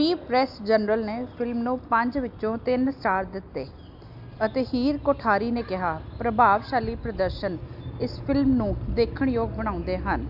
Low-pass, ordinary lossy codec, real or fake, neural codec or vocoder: 5.4 kHz; AAC, 48 kbps; real; none